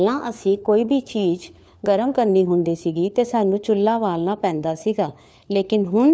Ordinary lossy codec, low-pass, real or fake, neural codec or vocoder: none; none; fake; codec, 16 kHz, 4 kbps, FunCodec, trained on LibriTTS, 50 frames a second